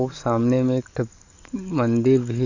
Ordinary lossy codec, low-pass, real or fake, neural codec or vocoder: none; 7.2 kHz; fake; codec, 44.1 kHz, 7.8 kbps, DAC